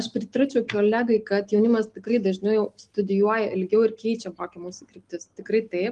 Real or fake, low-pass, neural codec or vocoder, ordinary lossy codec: real; 10.8 kHz; none; Opus, 24 kbps